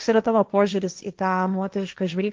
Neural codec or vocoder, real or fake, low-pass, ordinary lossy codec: codec, 16 kHz, 1.1 kbps, Voila-Tokenizer; fake; 7.2 kHz; Opus, 24 kbps